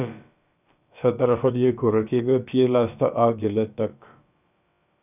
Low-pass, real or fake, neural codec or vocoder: 3.6 kHz; fake; codec, 16 kHz, about 1 kbps, DyCAST, with the encoder's durations